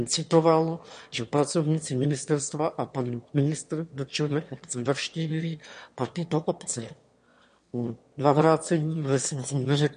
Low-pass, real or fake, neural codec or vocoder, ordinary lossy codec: 9.9 kHz; fake; autoencoder, 22.05 kHz, a latent of 192 numbers a frame, VITS, trained on one speaker; MP3, 48 kbps